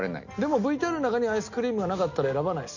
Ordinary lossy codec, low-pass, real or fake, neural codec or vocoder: none; 7.2 kHz; real; none